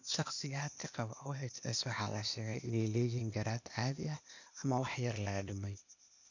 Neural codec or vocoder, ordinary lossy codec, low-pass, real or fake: codec, 16 kHz, 0.8 kbps, ZipCodec; none; 7.2 kHz; fake